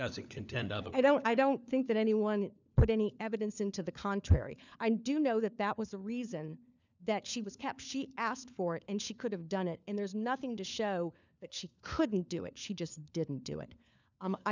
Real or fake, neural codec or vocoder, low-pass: fake; codec, 16 kHz, 4 kbps, FunCodec, trained on LibriTTS, 50 frames a second; 7.2 kHz